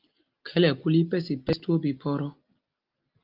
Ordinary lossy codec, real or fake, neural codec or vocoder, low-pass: Opus, 32 kbps; real; none; 5.4 kHz